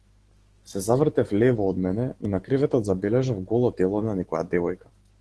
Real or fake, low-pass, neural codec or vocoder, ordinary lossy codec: fake; 10.8 kHz; autoencoder, 48 kHz, 128 numbers a frame, DAC-VAE, trained on Japanese speech; Opus, 16 kbps